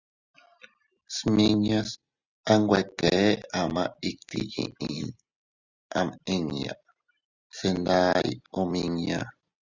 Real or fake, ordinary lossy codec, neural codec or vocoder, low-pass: real; Opus, 64 kbps; none; 7.2 kHz